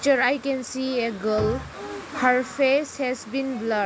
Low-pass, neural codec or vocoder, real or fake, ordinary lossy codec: none; none; real; none